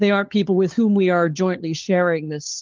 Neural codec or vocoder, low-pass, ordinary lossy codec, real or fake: codec, 16 kHz, 4 kbps, FunCodec, trained on LibriTTS, 50 frames a second; 7.2 kHz; Opus, 24 kbps; fake